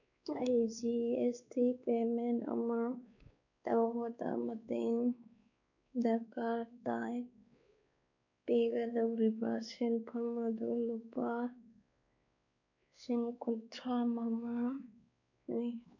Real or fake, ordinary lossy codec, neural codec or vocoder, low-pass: fake; none; codec, 16 kHz, 2 kbps, X-Codec, WavLM features, trained on Multilingual LibriSpeech; 7.2 kHz